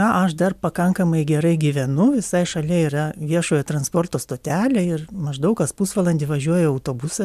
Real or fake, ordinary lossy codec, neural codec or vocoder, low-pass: real; MP3, 96 kbps; none; 14.4 kHz